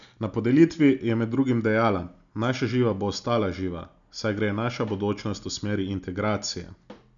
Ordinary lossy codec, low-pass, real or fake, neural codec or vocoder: none; 7.2 kHz; real; none